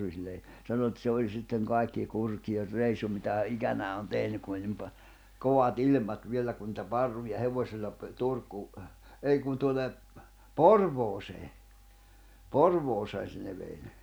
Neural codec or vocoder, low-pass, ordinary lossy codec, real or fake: none; none; none; real